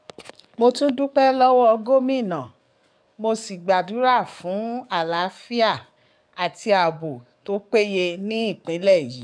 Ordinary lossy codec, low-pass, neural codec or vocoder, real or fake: none; 9.9 kHz; codec, 44.1 kHz, 7.8 kbps, DAC; fake